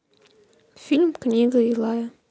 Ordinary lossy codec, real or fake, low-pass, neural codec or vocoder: none; real; none; none